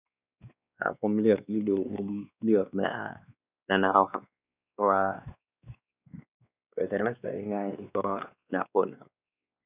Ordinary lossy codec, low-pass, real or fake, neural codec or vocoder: none; 3.6 kHz; fake; codec, 16 kHz, 2 kbps, X-Codec, WavLM features, trained on Multilingual LibriSpeech